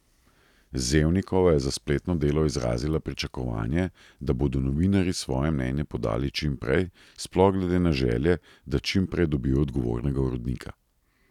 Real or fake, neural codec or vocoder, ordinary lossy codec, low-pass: real; none; none; 19.8 kHz